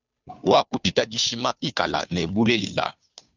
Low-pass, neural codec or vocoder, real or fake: 7.2 kHz; codec, 16 kHz, 2 kbps, FunCodec, trained on Chinese and English, 25 frames a second; fake